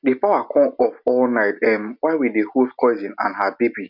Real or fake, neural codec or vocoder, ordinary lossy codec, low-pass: real; none; none; 5.4 kHz